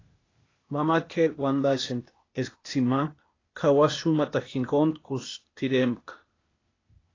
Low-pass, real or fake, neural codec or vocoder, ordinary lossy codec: 7.2 kHz; fake; codec, 16 kHz, 0.8 kbps, ZipCodec; AAC, 32 kbps